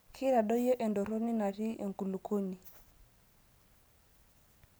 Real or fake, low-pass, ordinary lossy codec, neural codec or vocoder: real; none; none; none